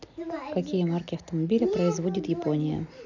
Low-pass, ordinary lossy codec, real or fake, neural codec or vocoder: 7.2 kHz; none; real; none